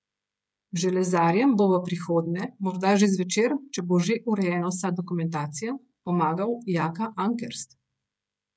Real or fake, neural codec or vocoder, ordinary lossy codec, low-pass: fake; codec, 16 kHz, 16 kbps, FreqCodec, smaller model; none; none